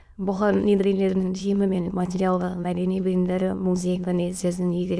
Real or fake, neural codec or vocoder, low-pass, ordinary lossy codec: fake; autoencoder, 22.05 kHz, a latent of 192 numbers a frame, VITS, trained on many speakers; none; none